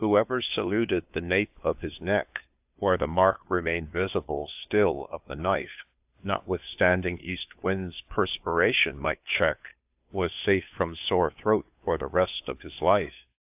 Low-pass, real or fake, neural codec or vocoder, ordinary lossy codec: 3.6 kHz; fake; codec, 16 kHz, 2 kbps, FunCodec, trained on Chinese and English, 25 frames a second; AAC, 32 kbps